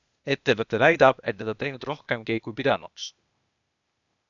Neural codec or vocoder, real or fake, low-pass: codec, 16 kHz, 0.8 kbps, ZipCodec; fake; 7.2 kHz